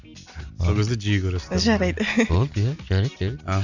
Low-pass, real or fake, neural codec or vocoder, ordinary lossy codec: 7.2 kHz; real; none; none